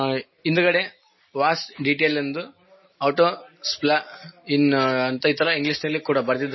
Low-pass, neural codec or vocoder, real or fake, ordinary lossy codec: 7.2 kHz; none; real; MP3, 24 kbps